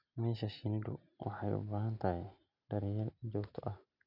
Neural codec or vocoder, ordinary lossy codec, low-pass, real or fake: none; none; 5.4 kHz; real